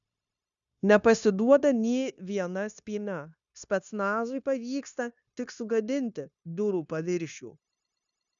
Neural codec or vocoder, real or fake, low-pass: codec, 16 kHz, 0.9 kbps, LongCat-Audio-Codec; fake; 7.2 kHz